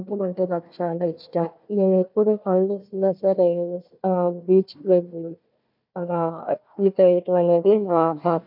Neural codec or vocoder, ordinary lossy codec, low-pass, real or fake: codec, 16 kHz, 1 kbps, FunCodec, trained on Chinese and English, 50 frames a second; none; 5.4 kHz; fake